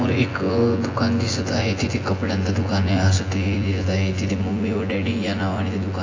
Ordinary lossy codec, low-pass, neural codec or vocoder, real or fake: AAC, 32 kbps; 7.2 kHz; vocoder, 24 kHz, 100 mel bands, Vocos; fake